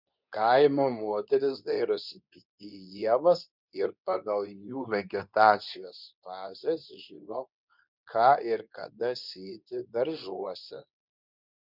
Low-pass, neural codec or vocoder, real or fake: 5.4 kHz; codec, 24 kHz, 0.9 kbps, WavTokenizer, medium speech release version 2; fake